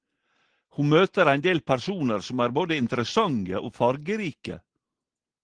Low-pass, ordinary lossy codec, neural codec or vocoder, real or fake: 9.9 kHz; Opus, 16 kbps; none; real